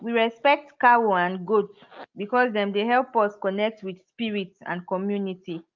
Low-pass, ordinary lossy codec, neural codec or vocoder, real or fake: 7.2 kHz; Opus, 24 kbps; none; real